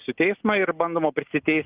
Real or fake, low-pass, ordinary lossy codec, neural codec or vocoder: real; 3.6 kHz; Opus, 32 kbps; none